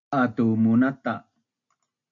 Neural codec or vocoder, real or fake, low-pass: none; real; 7.2 kHz